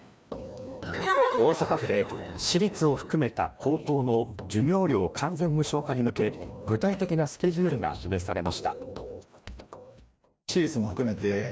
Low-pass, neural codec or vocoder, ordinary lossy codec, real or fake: none; codec, 16 kHz, 1 kbps, FreqCodec, larger model; none; fake